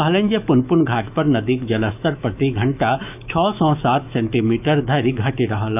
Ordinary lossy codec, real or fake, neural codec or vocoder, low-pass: none; fake; autoencoder, 48 kHz, 128 numbers a frame, DAC-VAE, trained on Japanese speech; 3.6 kHz